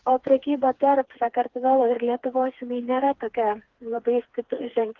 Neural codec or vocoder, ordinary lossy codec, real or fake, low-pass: codec, 16 kHz, 4 kbps, FreqCodec, smaller model; Opus, 16 kbps; fake; 7.2 kHz